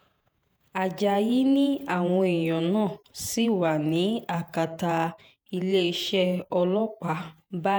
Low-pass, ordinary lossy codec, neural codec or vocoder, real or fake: none; none; vocoder, 48 kHz, 128 mel bands, Vocos; fake